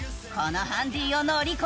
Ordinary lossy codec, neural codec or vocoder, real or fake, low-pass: none; none; real; none